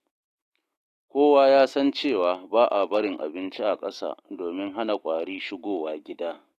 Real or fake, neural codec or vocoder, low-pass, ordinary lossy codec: fake; autoencoder, 48 kHz, 128 numbers a frame, DAC-VAE, trained on Japanese speech; 14.4 kHz; AAC, 48 kbps